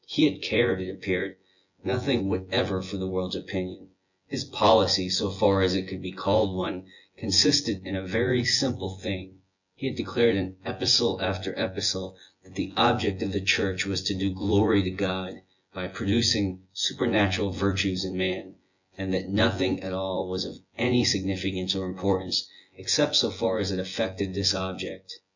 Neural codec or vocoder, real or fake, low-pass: vocoder, 24 kHz, 100 mel bands, Vocos; fake; 7.2 kHz